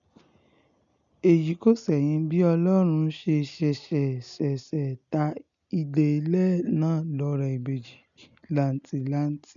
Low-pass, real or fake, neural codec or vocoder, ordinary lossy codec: 7.2 kHz; real; none; none